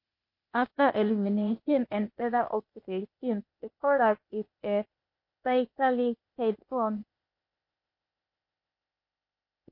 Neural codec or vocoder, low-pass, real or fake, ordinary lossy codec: codec, 16 kHz, 0.8 kbps, ZipCodec; 5.4 kHz; fake; MP3, 32 kbps